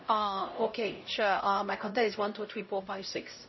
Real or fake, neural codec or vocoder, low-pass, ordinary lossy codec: fake; codec, 16 kHz, 0.5 kbps, X-Codec, HuBERT features, trained on LibriSpeech; 7.2 kHz; MP3, 24 kbps